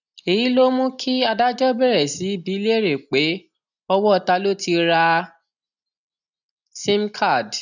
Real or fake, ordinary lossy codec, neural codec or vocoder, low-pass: real; none; none; 7.2 kHz